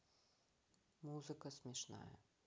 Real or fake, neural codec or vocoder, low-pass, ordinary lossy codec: real; none; none; none